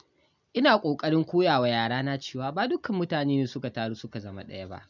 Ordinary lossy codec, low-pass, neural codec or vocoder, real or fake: none; 7.2 kHz; none; real